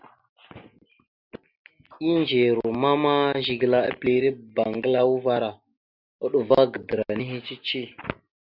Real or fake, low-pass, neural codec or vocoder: real; 5.4 kHz; none